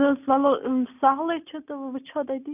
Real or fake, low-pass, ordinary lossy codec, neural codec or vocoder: real; 3.6 kHz; none; none